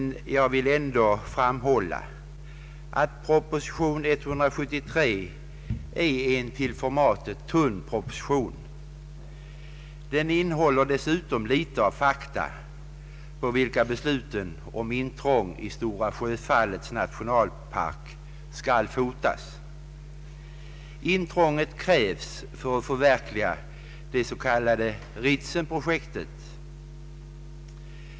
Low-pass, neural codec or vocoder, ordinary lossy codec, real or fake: none; none; none; real